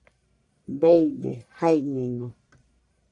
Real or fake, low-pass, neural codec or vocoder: fake; 10.8 kHz; codec, 44.1 kHz, 1.7 kbps, Pupu-Codec